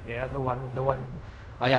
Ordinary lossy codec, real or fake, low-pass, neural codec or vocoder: none; fake; 10.8 kHz; codec, 16 kHz in and 24 kHz out, 0.4 kbps, LongCat-Audio-Codec, fine tuned four codebook decoder